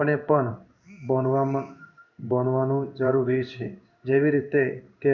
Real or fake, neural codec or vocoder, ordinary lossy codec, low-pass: fake; codec, 16 kHz in and 24 kHz out, 1 kbps, XY-Tokenizer; none; 7.2 kHz